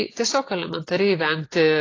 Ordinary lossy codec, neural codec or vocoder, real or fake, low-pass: AAC, 32 kbps; none; real; 7.2 kHz